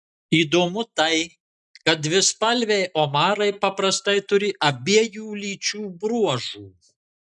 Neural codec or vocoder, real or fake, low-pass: none; real; 10.8 kHz